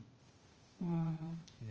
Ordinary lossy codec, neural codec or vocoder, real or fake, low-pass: Opus, 24 kbps; codec, 16 kHz, 0.8 kbps, ZipCodec; fake; 7.2 kHz